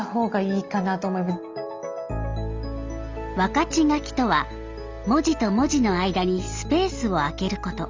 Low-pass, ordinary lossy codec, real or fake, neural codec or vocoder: 7.2 kHz; Opus, 32 kbps; real; none